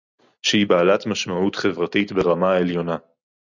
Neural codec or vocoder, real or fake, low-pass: none; real; 7.2 kHz